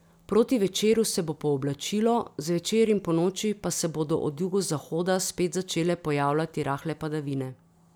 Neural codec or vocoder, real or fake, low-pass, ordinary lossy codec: none; real; none; none